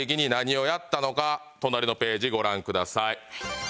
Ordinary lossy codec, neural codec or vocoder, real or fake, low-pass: none; none; real; none